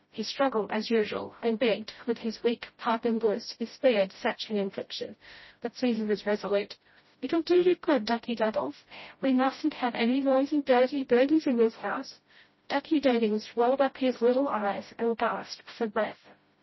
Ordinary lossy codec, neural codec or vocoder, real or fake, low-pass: MP3, 24 kbps; codec, 16 kHz, 0.5 kbps, FreqCodec, smaller model; fake; 7.2 kHz